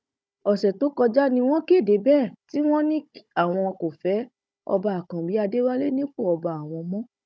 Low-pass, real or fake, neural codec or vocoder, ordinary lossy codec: none; fake; codec, 16 kHz, 16 kbps, FunCodec, trained on Chinese and English, 50 frames a second; none